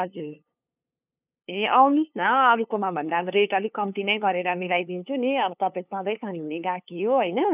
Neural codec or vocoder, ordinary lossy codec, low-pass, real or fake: codec, 16 kHz, 2 kbps, FunCodec, trained on LibriTTS, 25 frames a second; none; 3.6 kHz; fake